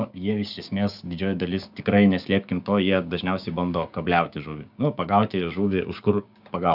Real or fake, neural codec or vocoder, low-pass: fake; codec, 16 kHz, 6 kbps, DAC; 5.4 kHz